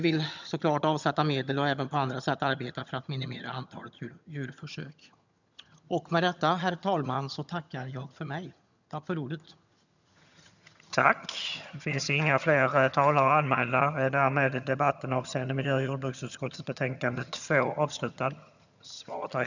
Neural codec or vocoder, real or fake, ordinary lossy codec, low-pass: vocoder, 22.05 kHz, 80 mel bands, HiFi-GAN; fake; none; 7.2 kHz